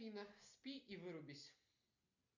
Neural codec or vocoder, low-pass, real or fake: none; 7.2 kHz; real